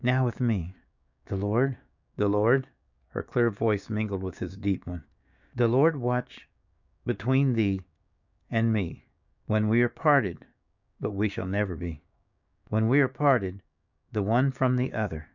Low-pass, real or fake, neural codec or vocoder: 7.2 kHz; fake; codec, 16 kHz, 6 kbps, DAC